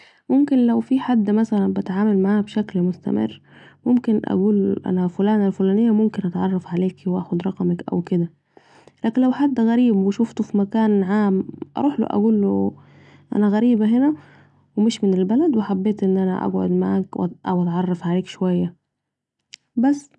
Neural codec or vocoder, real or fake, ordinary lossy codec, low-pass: none; real; none; 10.8 kHz